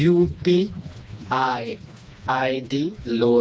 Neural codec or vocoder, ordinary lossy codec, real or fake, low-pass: codec, 16 kHz, 2 kbps, FreqCodec, smaller model; none; fake; none